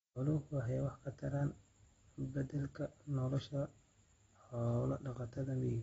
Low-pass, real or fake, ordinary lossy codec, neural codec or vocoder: 19.8 kHz; real; AAC, 24 kbps; none